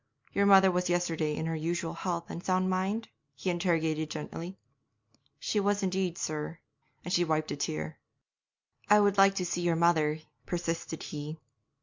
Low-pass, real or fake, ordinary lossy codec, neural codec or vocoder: 7.2 kHz; real; MP3, 64 kbps; none